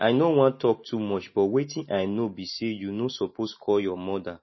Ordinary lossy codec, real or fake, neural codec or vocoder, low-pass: MP3, 24 kbps; real; none; 7.2 kHz